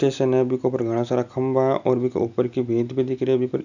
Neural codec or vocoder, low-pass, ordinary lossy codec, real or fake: none; 7.2 kHz; none; real